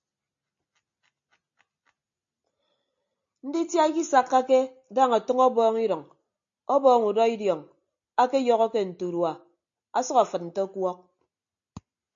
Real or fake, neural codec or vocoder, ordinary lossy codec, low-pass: real; none; AAC, 64 kbps; 7.2 kHz